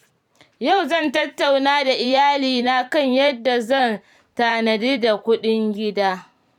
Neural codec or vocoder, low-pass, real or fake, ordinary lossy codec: vocoder, 44.1 kHz, 128 mel bands every 512 samples, BigVGAN v2; 19.8 kHz; fake; none